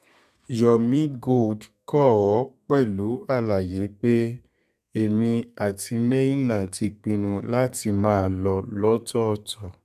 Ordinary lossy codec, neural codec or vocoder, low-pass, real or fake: none; codec, 32 kHz, 1.9 kbps, SNAC; 14.4 kHz; fake